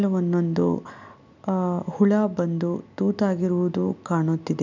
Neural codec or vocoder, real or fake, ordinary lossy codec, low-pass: none; real; none; 7.2 kHz